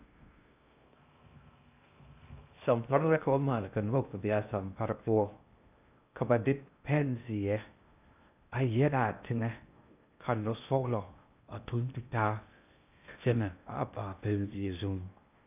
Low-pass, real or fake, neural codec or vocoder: 3.6 kHz; fake; codec, 16 kHz in and 24 kHz out, 0.6 kbps, FocalCodec, streaming, 2048 codes